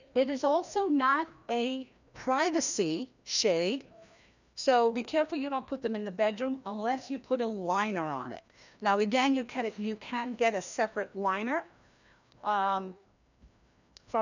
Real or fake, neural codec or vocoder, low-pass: fake; codec, 16 kHz, 1 kbps, FreqCodec, larger model; 7.2 kHz